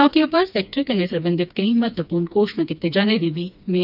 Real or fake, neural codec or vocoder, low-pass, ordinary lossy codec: fake; codec, 16 kHz, 2 kbps, FreqCodec, smaller model; 5.4 kHz; none